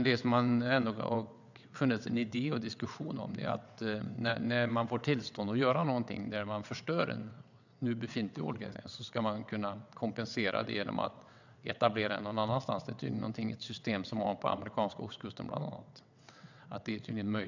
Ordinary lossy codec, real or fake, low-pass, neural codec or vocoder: none; fake; 7.2 kHz; vocoder, 22.05 kHz, 80 mel bands, WaveNeXt